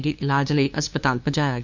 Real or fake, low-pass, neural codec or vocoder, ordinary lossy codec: fake; 7.2 kHz; codec, 16 kHz, 2 kbps, FunCodec, trained on LibriTTS, 25 frames a second; none